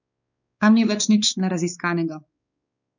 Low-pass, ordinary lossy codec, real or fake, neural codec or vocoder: 7.2 kHz; none; fake; codec, 16 kHz, 4 kbps, X-Codec, WavLM features, trained on Multilingual LibriSpeech